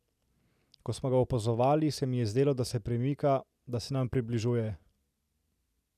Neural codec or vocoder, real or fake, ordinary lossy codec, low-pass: none; real; none; 14.4 kHz